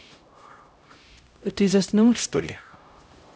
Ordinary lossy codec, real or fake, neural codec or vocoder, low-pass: none; fake; codec, 16 kHz, 0.5 kbps, X-Codec, HuBERT features, trained on LibriSpeech; none